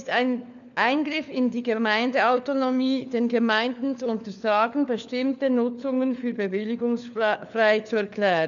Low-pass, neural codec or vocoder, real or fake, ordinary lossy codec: 7.2 kHz; codec, 16 kHz, 4 kbps, FunCodec, trained on LibriTTS, 50 frames a second; fake; none